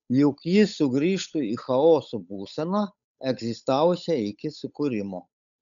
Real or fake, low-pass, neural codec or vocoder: fake; 7.2 kHz; codec, 16 kHz, 8 kbps, FunCodec, trained on Chinese and English, 25 frames a second